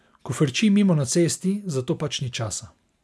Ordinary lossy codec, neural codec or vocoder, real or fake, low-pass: none; none; real; none